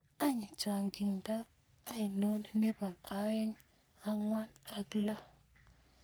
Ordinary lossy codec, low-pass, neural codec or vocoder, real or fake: none; none; codec, 44.1 kHz, 3.4 kbps, Pupu-Codec; fake